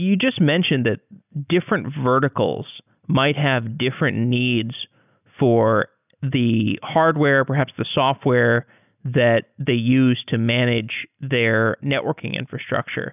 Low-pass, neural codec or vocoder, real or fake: 3.6 kHz; none; real